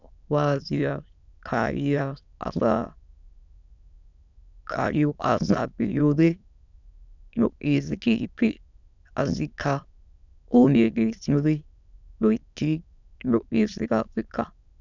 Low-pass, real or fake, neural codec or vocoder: 7.2 kHz; fake; autoencoder, 22.05 kHz, a latent of 192 numbers a frame, VITS, trained on many speakers